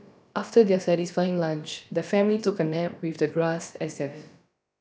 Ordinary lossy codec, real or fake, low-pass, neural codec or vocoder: none; fake; none; codec, 16 kHz, about 1 kbps, DyCAST, with the encoder's durations